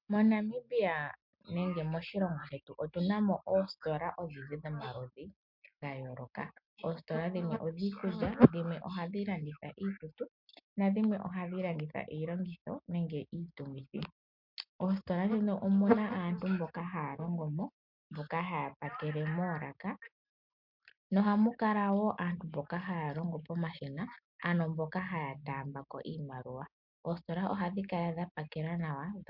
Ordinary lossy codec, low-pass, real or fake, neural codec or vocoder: MP3, 48 kbps; 5.4 kHz; real; none